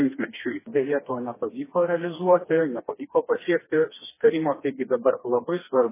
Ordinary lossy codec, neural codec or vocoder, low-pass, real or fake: MP3, 16 kbps; codec, 16 kHz, 2 kbps, FreqCodec, smaller model; 3.6 kHz; fake